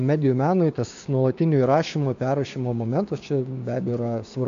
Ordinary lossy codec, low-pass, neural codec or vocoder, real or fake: AAC, 64 kbps; 7.2 kHz; codec, 16 kHz, 6 kbps, DAC; fake